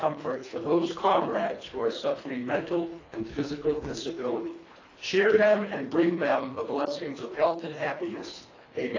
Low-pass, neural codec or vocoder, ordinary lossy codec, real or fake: 7.2 kHz; codec, 24 kHz, 1.5 kbps, HILCodec; AAC, 32 kbps; fake